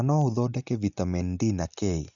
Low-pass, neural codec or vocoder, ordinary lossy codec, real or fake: 7.2 kHz; none; none; real